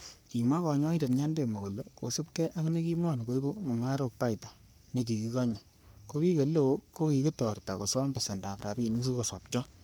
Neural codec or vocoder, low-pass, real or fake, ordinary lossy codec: codec, 44.1 kHz, 3.4 kbps, Pupu-Codec; none; fake; none